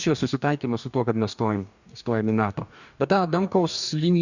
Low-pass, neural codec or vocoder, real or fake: 7.2 kHz; codec, 44.1 kHz, 2.6 kbps, DAC; fake